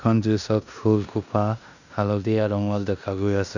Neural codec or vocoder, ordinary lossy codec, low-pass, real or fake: codec, 16 kHz in and 24 kHz out, 0.9 kbps, LongCat-Audio-Codec, four codebook decoder; none; 7.2 kHz; fake